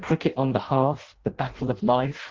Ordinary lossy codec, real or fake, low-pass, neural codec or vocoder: Opus, 16 kbps; fake; 7.2 kHz; codec, 16 kHz in and 24 kHz out, 0.6 kbps, FireRedTTS-2 codec